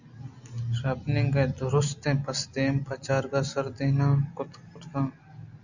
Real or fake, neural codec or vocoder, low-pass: real; none; 7.2 kHz